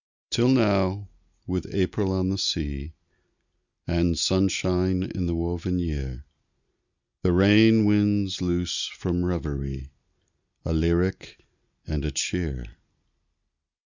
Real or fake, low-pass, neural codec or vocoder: real; 7.2 kHz; none